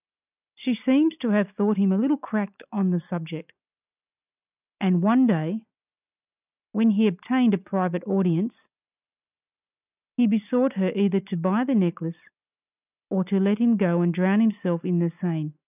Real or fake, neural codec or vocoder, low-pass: real; none; 3.6 kHz